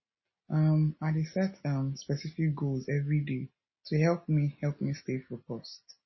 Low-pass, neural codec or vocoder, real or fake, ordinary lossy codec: 7.2 kHz; none; real; MP3, 24 kbps